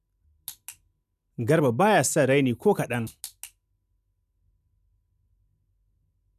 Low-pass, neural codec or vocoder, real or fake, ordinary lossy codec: 14.4 kHz; none; real; none